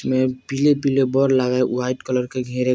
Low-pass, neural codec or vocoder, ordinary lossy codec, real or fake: none; none; none; real